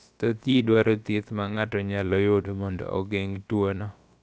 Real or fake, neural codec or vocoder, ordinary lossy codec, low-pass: fake; codec, 16 kHz, about 1 kbps, DyCAST, with the encoder's durations; none; none